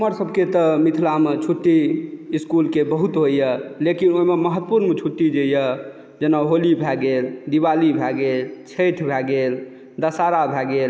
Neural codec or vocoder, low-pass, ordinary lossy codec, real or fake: none; none; none; real